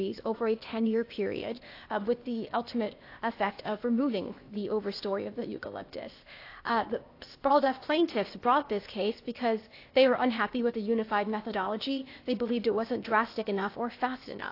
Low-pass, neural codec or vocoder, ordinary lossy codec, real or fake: 5.4 kHz; codec, 16 kHz, 0.8 kbps, ZipCodec; AAC, 32 kbps; fake